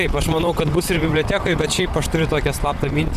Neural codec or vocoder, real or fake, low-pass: vocoder, 44.1 kHz, 128 mel bands, Pupu-Vocoder; fake; 14.4 kHz